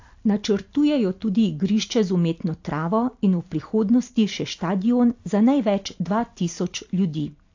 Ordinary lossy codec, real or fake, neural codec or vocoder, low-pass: AAC, 48 kbps; real; none; 7.2 kHz